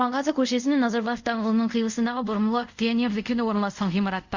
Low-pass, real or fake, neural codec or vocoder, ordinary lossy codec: 7.2 kHz; fake; codec, 24 kHz, 0.5 kbps, DualCodec; Opus, 64 kbps